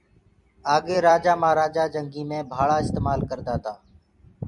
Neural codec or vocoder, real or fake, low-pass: vocoder, 44.1 kHz, 128 mel bands every 256 samples, BigVGAN v2; fake; 10.8 kHz